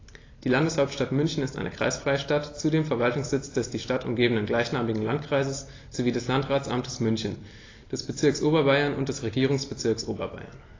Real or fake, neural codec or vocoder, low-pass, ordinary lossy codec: real; none; 7.2 kHz; AAC, 32 kbps